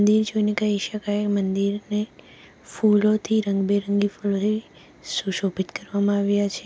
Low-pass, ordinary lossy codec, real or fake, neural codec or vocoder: none; none; real; none